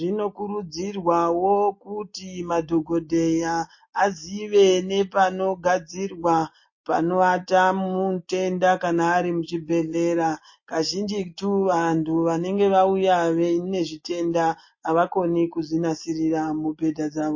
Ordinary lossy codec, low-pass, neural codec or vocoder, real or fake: MP3, 32 kbps; 7.2 kHz; none; real